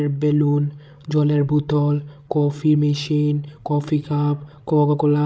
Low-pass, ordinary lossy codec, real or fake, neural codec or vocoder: none; none; fake; codec, 16 kHz, 16 kbps, FreqCodec, larger model